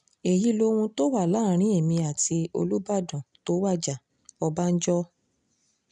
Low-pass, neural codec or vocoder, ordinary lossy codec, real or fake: 9.9 kHz; none; none; real